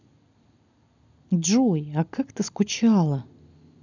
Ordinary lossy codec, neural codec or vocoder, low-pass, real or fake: none; none; 7.2 kHz; real